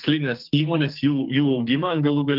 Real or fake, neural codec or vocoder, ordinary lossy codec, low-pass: fake; codec, 44.1 kHz, 2.6 kbps, SNAC; Opus, 32 kbps; 5.4 kHz